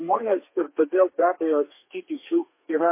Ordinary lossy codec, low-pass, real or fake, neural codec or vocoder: MP3, 24 kbps; 3.6 kHz; fake; codec, 32 kHz, 1.9 kbps, SNAC